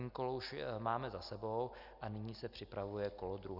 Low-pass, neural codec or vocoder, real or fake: 5.4 kHz; none; real